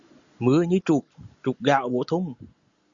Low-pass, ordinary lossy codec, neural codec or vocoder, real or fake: 7.2 kHz; Opus, 64 kbps; none; real